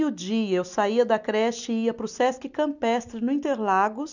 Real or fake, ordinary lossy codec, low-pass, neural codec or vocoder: real; none; 7.2 kHz; none